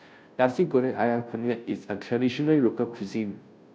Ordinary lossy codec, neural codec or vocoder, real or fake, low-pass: none; codec, 16 kHz, 0.5 kbps, FunCodec, trained on Chinese and English, 25 frames a second; fake; none